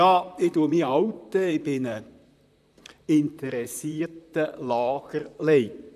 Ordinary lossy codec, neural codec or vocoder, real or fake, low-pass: none; vocoder, 44.1 kHz, 128 mel bands, Pupu-Vocoder; fake; 14.4 kHz